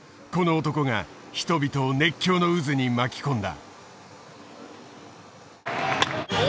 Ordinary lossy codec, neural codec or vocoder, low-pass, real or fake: none; none; none; real